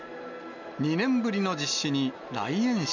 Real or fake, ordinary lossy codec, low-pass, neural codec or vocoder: real; none; 7.2 kHz; none